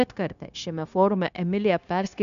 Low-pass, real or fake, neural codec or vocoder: 7.2 kHz; fake; codec, 16 kHz, 0.9 kbps, LongCat-Audio-Codec